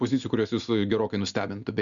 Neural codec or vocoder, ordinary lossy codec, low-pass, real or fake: none; Opus, 64 kbps; 7.2 kHz; real